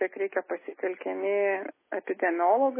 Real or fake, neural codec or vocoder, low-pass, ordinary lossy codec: real; none; 3.6 kHz; MP3, 16 kbps